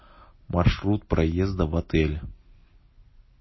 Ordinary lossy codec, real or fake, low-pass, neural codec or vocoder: MP3, 24 kbps; real; 7.2 kHz; none